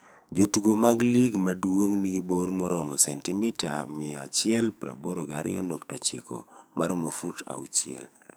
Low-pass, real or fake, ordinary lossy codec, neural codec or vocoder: none; fake; none; codec, 44.1 kHz, 2.6 kbps, SNAC